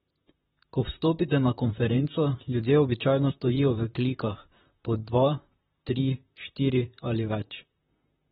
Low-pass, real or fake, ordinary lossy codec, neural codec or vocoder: 19.8 kHz; fake; AAC, 16 kbps; vocoder, 44.1 kHz, 128 mel bands, Pupu-Vocoder